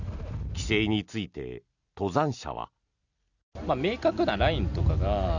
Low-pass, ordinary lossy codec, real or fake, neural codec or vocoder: 7.2 kHz; none; real; none